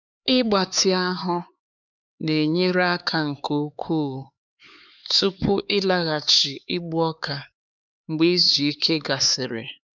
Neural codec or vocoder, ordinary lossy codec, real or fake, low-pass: codec, 16 kHz, 4 kbps, X-Codec, HuBERT features, trained on LibriSpeech; none; fake; 7.2 kHz